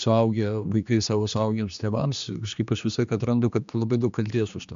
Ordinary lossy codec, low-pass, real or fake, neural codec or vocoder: MP3, 64 kbps; 7.2 kHz; fake; codec, 16 kHz, 2 kbps, X-Codec, HuBERT features, trained on general audio